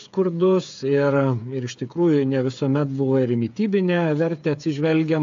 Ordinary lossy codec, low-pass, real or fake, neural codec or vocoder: AAC, 64 kbps; 7.2 kHz; fake; codec, 16 kHz, 8 kbps, FreqCodec, smaller model